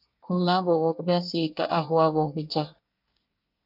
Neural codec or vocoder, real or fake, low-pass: codec, 24 kHz, 1 kbps, SNAC; fake; 5.4 kHz